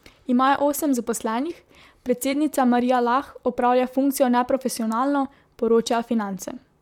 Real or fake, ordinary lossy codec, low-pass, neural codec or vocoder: fake; MP3, 96 kbps; 19.8 kHz; vocoder, 44.1 kHz, 128 mel bands, Pupu-Vocoder